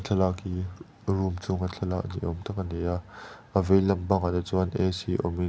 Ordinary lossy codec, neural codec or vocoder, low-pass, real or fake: none; none; none; real